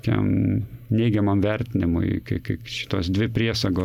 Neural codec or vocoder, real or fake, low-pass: none; real; 19.8 kHz